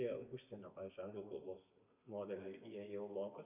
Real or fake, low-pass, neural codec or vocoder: real; 3.6 kHz; none